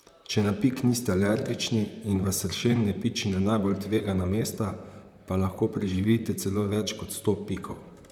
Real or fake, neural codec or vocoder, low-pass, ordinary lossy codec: fake; vocoder, 44.1 kHz, 128 mel bands, Pupu-Vocoder; 19.8 kHz; none